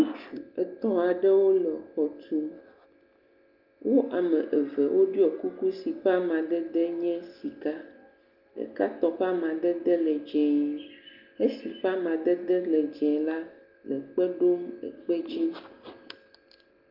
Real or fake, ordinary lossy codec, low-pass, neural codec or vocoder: real; Opus, 32 kbps; 5.4 kHz; none